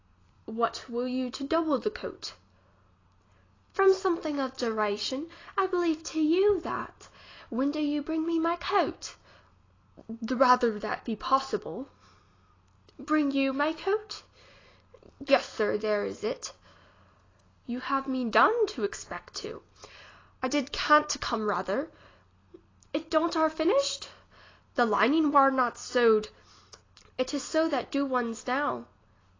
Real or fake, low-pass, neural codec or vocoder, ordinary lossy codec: real; 7.2 kHz; none; AAC, 32 kbps